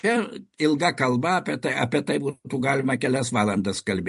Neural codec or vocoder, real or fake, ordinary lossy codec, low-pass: vocoder, 44.1 kHz, 128 mel bands every 256 samples, BigVGAN v2; fake; MP3, 48 kbps; 14.4 kHz